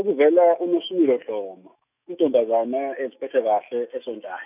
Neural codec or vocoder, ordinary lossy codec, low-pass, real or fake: autoencoder, 48 kHz, 128 numbers a frame, DAC-VAE, trained on Japanese speech; none; 3.6 kHz; fake